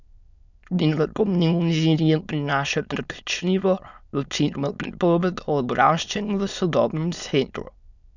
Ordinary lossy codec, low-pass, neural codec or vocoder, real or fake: none; 7.2 kHz; autoencoder, 22.05 kHz, a latent of 192 numbers a frame, VITS, trained on many speakers; fake